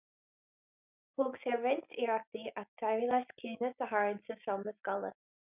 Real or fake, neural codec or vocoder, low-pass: real; none; 3.6 kHz